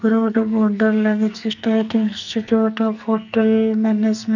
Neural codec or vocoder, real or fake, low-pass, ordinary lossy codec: codec, 44.1 kHz, 2.6 kbps, SNAC; fake; 7.2 kHz; none